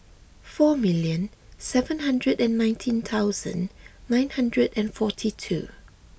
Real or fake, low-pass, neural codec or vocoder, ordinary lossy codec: real; none; none; none